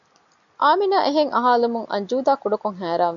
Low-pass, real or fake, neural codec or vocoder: 7.2 kHz; real; none